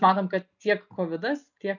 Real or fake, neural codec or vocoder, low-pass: real; none; 7.2 kHz